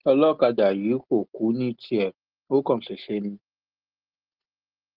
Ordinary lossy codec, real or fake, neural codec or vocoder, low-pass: Opus, 16 kbps; real; none; 5.4 kHz